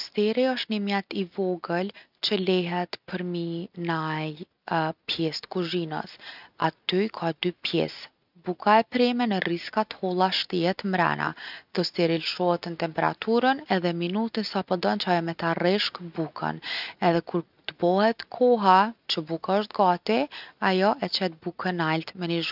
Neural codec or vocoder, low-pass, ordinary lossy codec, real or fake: none; 5.4 kHz; none; real